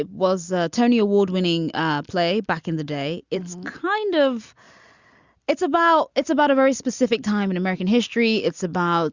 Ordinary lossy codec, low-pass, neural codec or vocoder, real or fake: Opus, 64 kbps; 7.2 kHz; none; real